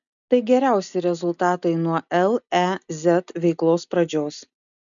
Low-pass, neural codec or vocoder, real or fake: 7.2 kHz; none; real